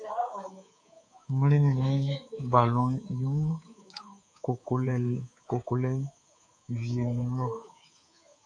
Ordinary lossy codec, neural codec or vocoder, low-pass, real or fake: MP3, 48 kbps; codec, 24 kHz, 3.1 kbps, DualCodec; 9.9 kHz; fake